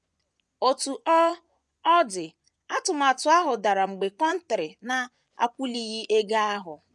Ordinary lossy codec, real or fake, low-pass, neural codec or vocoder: none; fake; none; vocoder, 24 kHz, 100 mel bands, Vocos